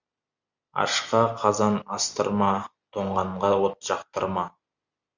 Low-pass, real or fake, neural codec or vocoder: 7.2 kHz; real; none